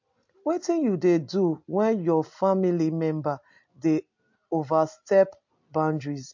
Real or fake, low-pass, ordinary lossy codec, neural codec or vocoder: real; 7.2 kHz; MP3, 48 kbps; none